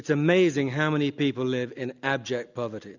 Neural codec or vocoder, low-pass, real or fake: none; 7.2 kHz; real